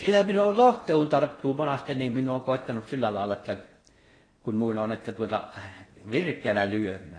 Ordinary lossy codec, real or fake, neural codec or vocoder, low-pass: AAC, 32 kbps; fake; codec, 16 kHz in and 24 kHz out, 0.6 kbps, FocalCodec, streaming, 4096 codes; 9.9 kHz